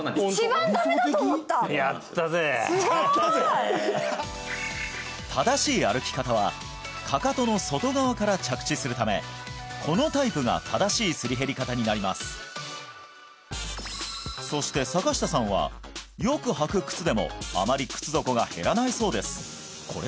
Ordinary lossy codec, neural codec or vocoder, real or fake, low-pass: none; none; real; none